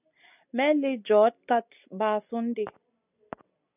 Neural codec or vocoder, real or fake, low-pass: none; real; 3.6 kHz